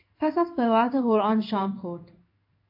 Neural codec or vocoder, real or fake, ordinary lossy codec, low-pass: codec, 16 kHz in and 24 kHz out, 1 kbps, XY-Tokenizer; fake; AAC, 48 kbps; 5.4 kHz